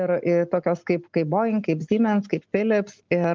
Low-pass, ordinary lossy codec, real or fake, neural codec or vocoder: 7.2 kHz; Opus, 24 kbps; real; none